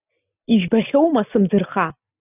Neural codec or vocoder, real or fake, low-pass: none; real; 3.6 kHz